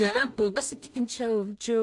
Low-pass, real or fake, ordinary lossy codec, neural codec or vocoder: 10.8 kHz; fake; AAC, 64 kbps; codec, 16 kHz in and 24 kHz out, 0.4 kbps, LongCat-Audio-Codec, two codebook decoder